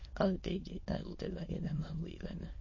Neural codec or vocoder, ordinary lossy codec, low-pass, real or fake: autoencoder, 22.05 kHz, a latent of 192 numbers a frame, VITS, trained on many speakers; MP3, 32 kbps; 7.2 kHz; fake